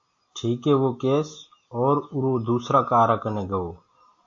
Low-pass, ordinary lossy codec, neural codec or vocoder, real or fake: 7.2 kHz; AAC, 48 kbps; none; real